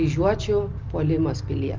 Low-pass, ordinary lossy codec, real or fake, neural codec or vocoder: 7.2 kHz; Opus, 32 kbps; real; none